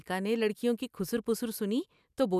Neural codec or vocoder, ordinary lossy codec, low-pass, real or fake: none; none; 14.4 kHz; real